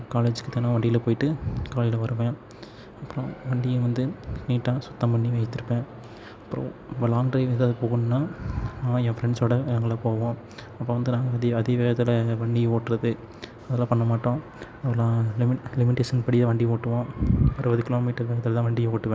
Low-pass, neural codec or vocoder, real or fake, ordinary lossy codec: none; none; real; none